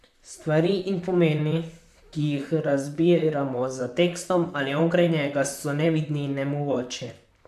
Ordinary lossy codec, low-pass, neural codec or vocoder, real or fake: MP3, 96 kbps; 14.4 kHz; vocoder, 44.1 kHz, 128 mel bands, Pupu-Vocoder; fake